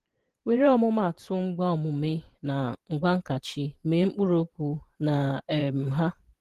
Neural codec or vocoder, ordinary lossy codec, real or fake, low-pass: vocoder, 44.1 kHz, 128 mel bands every 512 samples, BigVGAN v2; Opus, 16 kbps; fake; 14.4 kHz